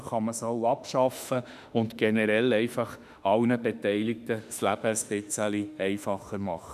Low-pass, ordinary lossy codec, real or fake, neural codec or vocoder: 14.4 kHz; none; fake; autoencoder, 48 kHz, 32 numbers a frame, DAC-VAE, trained on Japanese speech